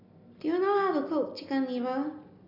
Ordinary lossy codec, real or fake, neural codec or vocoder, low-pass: AAC, 32 kbps; real; none; 5.4 kHz